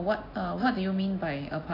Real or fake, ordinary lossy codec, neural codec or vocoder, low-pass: real; AAC, 32 kbps; none; 5.4 kHz